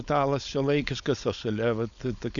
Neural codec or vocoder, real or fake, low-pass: codec, 16 kHz, 4.8 kbps, FACodec; fake; 7.2 kHz